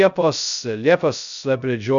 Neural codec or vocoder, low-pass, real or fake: codec, 16 kHz, 0.2 kbps, FocalCodec; 7.2 kHz; fake